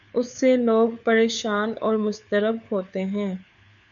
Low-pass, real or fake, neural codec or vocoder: 7.2 kHz; fake; codec, 16 kHz, 4 kbps, FunCodec, trained on LibriTTS, 50 frames a second